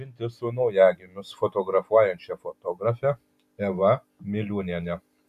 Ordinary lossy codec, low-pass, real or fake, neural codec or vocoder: AAC, 96 kbps; 14.4 kHz; real; none